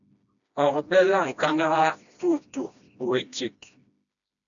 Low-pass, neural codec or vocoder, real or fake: 7.2 kHz; codec, 16 kHz, 1 kbps, FreqCodec, smaller model; fake